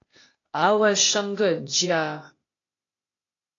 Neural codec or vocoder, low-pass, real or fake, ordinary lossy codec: codec, 16 kHz, 0.8 kbps, ZipCodec; 7.2 kHz; fake; AAC, 32 kbps